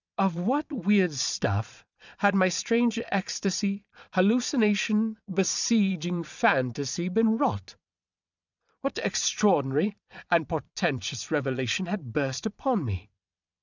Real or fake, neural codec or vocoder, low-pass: fake; vocoder, 22.05 kHz, 80 mel bands, Vocos; 7.2 kHz